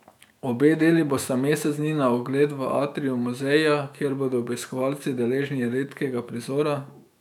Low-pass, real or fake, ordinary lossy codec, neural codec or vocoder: 19.8 kHz; fake; none; autoencoder, 48 kHz, 128 numbers a frame, DAC-VAE, trained on Japanese speech